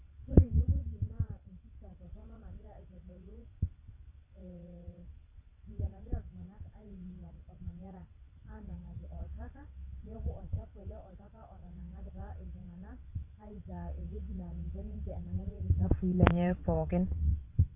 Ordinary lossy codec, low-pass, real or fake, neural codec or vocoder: none; 3.6 kHz; fake; vocoder, 22.05 kHz, 80 mel bands, WaveNeXt